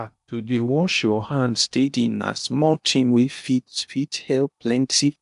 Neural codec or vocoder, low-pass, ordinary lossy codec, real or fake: codec, 16 kHz in and 24 kHz out, 0.8 kbps, FocalCodec, streaming, 65536 codes; 10.8 kHz; none; fake